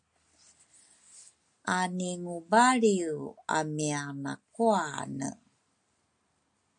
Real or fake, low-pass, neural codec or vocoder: real; 9.9 kHz; none